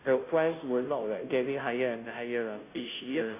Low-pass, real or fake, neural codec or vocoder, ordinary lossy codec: 3.6 kHz; fake; codec, 16 kHz, 0.5 kbps, FunCodec, trained on Chinese and English, 25 frames a second; AAC, 24 kbps